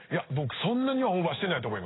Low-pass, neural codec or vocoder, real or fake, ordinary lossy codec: 7.2 kHz; codec, 16 kHz in and 24 kHz out, 1 kbps, XY-Tokenizer; fake; AAC, 16 kbps